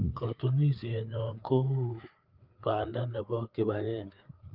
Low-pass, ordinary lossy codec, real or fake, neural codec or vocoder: 5.4 kHz; Opus, 32 kbps; fake; codec, 16 kHz, 4 kbps, FreqCodec, larger model